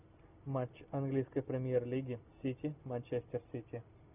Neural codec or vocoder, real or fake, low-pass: none; real; 3.6 kHz